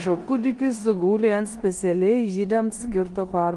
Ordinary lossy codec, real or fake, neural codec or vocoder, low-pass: Opus, 24 kbps; fake; codec, 16 kHz in and 24 kHz out, 0.9 kbps, LongCat-Audio-Codec, four codebook decoder; 10.8 kHz